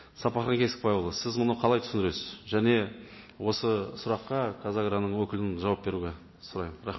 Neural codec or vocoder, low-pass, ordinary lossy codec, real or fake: none; 7.2 kHz; MP3, 24 kbps; real